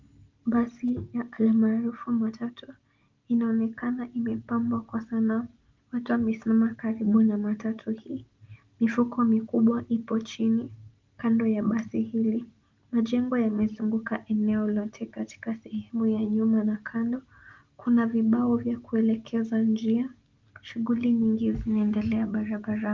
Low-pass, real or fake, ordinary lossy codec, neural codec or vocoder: 7.2 kHz; real; Opus, 32 kbps; none